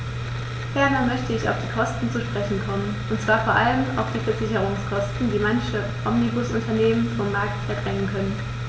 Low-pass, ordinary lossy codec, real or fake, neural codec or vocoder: none; none; real; none